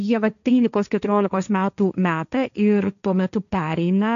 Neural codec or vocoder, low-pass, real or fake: codec, 16 kHz, 1.1 kbps, Voila-Tokenizer; 7.2 kHz; fake